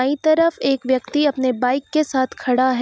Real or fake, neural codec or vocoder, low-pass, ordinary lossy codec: real; none; none; none